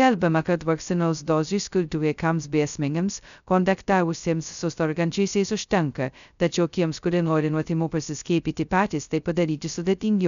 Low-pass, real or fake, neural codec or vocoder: 7.2 kHz; fake; codec, 16 kHz, 0.2 kbps, FocalCodec